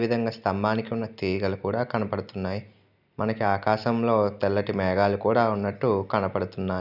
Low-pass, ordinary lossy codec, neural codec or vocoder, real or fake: 5.4 kHz; none; none; real